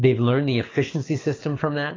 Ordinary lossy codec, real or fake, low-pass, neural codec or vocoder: AAC, 32 kbps; fake; 7.2 kHz; codec, 24 kHz, 6 kbps, HILCodec